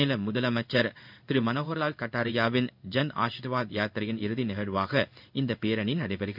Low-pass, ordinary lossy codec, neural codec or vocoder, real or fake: 5.4 kHz; none; codec, 16 kHz in and 24 kHz out, 1 kbps, XY-Tokenizer; fake